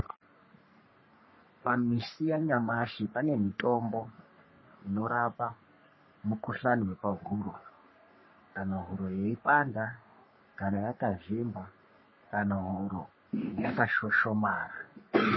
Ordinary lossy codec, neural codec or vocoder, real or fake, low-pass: MP3, 24 kbps; codec, 44.1 kHz, 3.4 kbps, Pupu-Codec; fake; 7.2 kHz